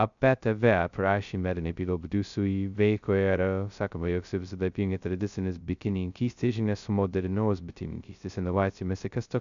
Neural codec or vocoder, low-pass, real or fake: codec, 16 kHz, 0.2 kbps, FocalCodec; 7.2 kHz; fake